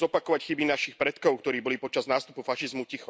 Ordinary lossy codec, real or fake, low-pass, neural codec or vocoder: none; real; none; none